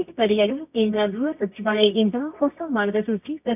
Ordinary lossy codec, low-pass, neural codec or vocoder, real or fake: none; 3.6 kHz; codec, 24 kHz, 0.9 kbps, WavTokenizer, medium music audio release; fake